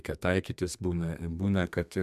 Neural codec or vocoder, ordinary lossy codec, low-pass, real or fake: codec, 32 kHz, 1.9 kbps, SNAC; MP3, 96 kbps; 14.4 kHz; fake